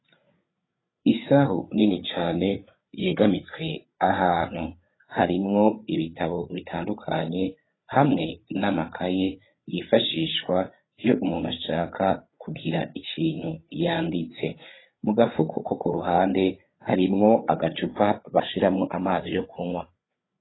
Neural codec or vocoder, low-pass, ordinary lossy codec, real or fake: codec, 16 kHz, 8 kbps, FreqCodec, larger model; 7.2 kHz; AAC, 16 kbps; fake